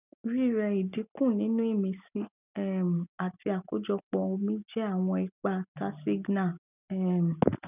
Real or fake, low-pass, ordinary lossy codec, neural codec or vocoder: real; 3.6 kHz; none; none